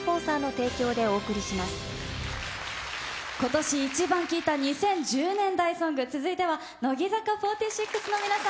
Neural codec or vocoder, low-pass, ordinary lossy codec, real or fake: none; none; none; real